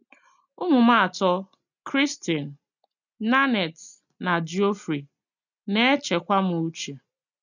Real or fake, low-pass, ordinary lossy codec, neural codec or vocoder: real; 7.2 kHz; none; none